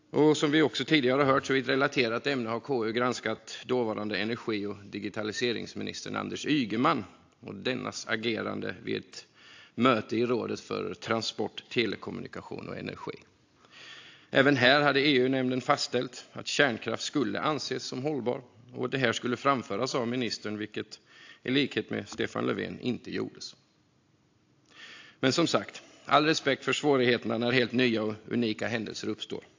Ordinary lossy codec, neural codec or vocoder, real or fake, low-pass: AAC, 48 kbps; none; real; 7.2 kHz